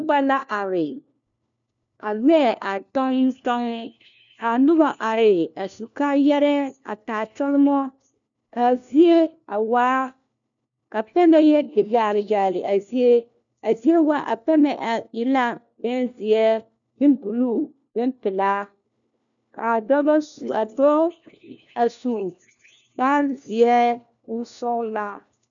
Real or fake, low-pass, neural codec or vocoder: fake; 7.2 kHz; codec, 16 kHz, 1 kbps, FunCodec, trained on LibriTTS, 50 frames a second